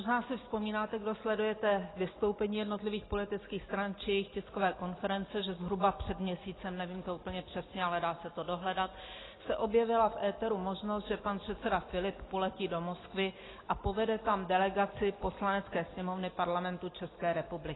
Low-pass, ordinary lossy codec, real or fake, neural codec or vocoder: 7.2 kHz; AAC, 16 kbps; real; none